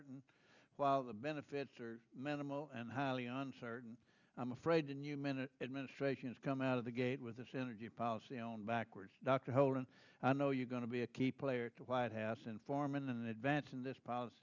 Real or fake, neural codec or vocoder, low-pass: real; none; 7.2 kHz